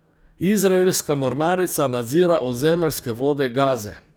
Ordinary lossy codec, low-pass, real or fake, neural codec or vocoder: none; none; fake; codec, 44.1 kHz, 2.6 kbps, DAC